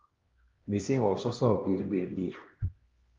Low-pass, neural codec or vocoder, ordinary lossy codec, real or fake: 7.2 kHz; codec, 16 kHz, 1 kbps, X-Codec, HuBERT features, trained on LibriSpeech; Opus, 32 kbps; fake